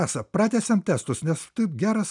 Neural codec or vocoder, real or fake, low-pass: none; real; 10.8 kHz